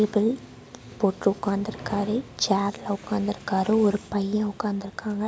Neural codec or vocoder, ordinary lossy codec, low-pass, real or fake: none; none; none; real